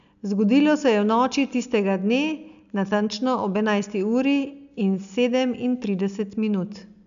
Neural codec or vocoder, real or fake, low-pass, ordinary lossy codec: none; real; 7.2 kHz; none